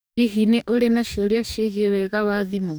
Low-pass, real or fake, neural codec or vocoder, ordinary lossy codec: none; fake; codec, 44.1 kHz, 2.6 kbps, DAC; none